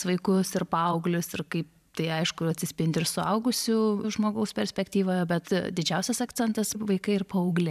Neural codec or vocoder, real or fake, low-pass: vocoder, 44.1 kHz, 128 mel bands every 512 samples, BigVGAN v2; fake; 14.4 kHz